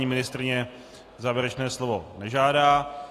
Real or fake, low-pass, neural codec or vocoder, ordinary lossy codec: real; 14.4 kHz; none; AAC, 48 kbps